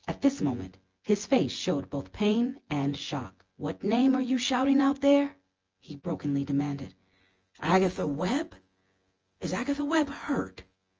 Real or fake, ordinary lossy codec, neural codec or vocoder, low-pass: fake; Opus, 24 kbps; vocoder, 24 kHz, 100 mel bands, Vocos; 7.2 kHz